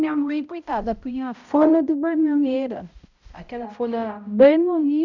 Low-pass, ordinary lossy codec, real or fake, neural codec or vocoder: 7.2 kHz; none; fake; codec, 16 kHz, 0.5 kbps, X-Codec, HuBERT features, trained on balanced general audio